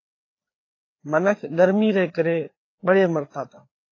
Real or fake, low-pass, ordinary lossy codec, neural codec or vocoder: fake; 7.2 kHz; AAC, 32 kbps; codec, 16 kHz, 4 kbps, FreqCodec, larger model